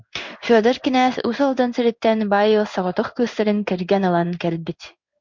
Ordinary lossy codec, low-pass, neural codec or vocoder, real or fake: MP3, 64 kbps; 7.2 kHz; codec, 16 kHz in and 24 kHz out, 1 kbps, XY-Tokenizer; fake